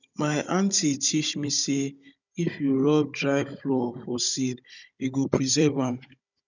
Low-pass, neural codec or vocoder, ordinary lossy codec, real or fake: 7.2 kHz; codec, 16 kHz, 16 kbps, FunCodec, trained on Chinese and English, 50 frames a second; none; fake